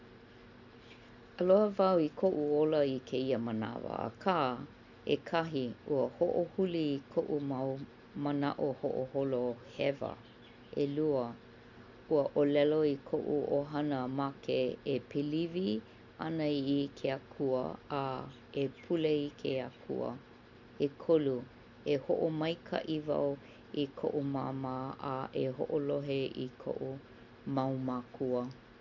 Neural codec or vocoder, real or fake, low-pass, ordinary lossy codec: none; real; 7.2 kHz; none